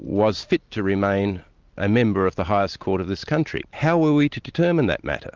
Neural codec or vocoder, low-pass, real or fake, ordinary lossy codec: none; 7.2 kHz; real; Opus, 32 kbps